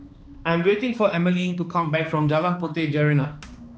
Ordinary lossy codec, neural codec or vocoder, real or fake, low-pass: none; codec, 16 kHz, 2 kbps, X-Codec, HuBERT features, trained on balanced general audio; fake; none